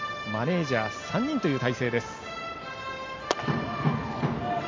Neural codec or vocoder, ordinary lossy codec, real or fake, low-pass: none; MP3, 48 kbps; real; 7.2 kHz